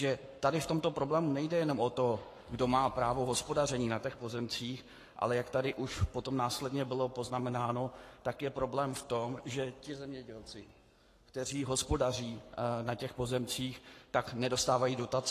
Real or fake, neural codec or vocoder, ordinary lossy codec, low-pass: fake; codec, 44.1 kHz, 7.8 kbps, Pupu-Codec; AAC, 48 kbps; 14.4 kHz